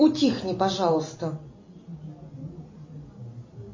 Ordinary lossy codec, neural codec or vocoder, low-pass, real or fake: MP3, 32 kbps; none; 7.2 kHz; real